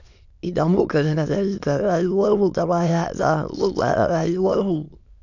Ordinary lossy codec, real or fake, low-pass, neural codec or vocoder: none; fake; 7.2 kHz; autoencoder, 22.05 kHz, a latent of 192 numbers a frame, VITS, trained on many speakers